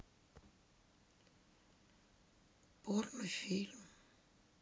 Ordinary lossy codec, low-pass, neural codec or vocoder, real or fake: none; none; none; real